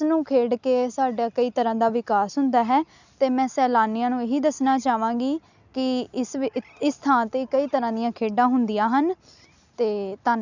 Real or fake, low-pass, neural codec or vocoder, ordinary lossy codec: real; 7.2 kHz; none; none